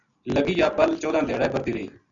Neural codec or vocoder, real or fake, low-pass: none; real; 7.2 kHz